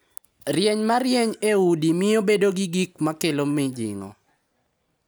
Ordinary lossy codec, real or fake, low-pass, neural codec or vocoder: none; real; none; none